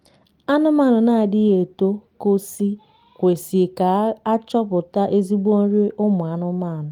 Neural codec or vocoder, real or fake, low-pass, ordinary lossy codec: none; real; 19.8 kHz; Opus, 32 kbps